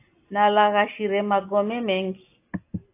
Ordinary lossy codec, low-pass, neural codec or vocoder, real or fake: AAC, 24 kbps; 3.6 kHz; none; real